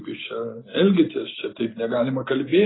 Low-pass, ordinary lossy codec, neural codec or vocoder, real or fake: 7.2 kHz; AAC, 16 kbps; none; real